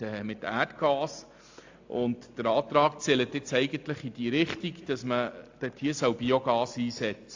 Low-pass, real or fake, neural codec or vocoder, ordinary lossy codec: 7.2 kHz; real; none; AAC, 48 kbps